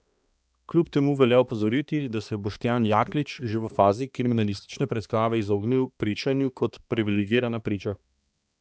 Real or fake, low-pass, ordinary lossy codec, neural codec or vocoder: fake; none; none; codec, 16 kHz, 2 kbps, X-Codec, HuBERT features, trained on balanced general audio